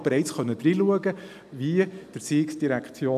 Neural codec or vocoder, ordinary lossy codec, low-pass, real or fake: none; AAC, 96 kbps; 14.4 kHz; real